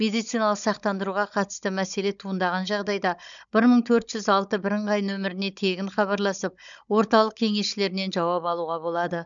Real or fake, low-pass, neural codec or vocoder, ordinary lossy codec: fake; 7.2 kHz; codec, 16 kHz, 16 kbps, FunCodec, trained on Chinese and English, 50 frames a second; none